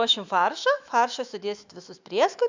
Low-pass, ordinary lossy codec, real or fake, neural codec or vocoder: 7.2 kHz; Opus, 64 kbps; fake; autoencoder, 48 kHz, 128 numbers a frame, DAC-VAE, trained on Japanese speech